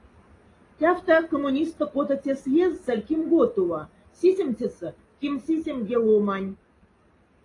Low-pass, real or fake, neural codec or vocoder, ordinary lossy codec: 10.8 kHz; real; none; AAC, 32 kbps